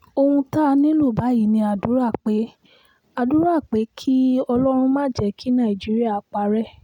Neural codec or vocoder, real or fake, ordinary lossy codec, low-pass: none; real; none; 19.8 kHz